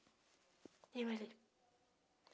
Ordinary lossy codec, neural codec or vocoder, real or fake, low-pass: none; none; real; none